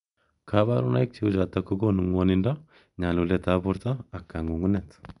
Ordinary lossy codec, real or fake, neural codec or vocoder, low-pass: none; real; none; 10.8 kHz